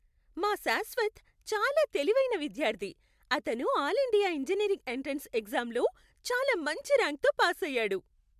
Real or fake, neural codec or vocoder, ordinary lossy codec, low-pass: real; none; MP3, 96 kbps; 14.4 kHz